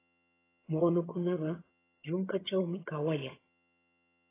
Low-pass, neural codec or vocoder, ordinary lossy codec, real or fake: 3.6 kHz; vocoder, 22.05 kHz, 80 mel bands, HiFi-GAN; AAC, 16 kbps; fake